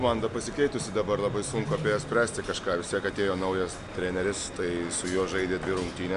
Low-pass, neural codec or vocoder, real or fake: 10.8 kHz; none; real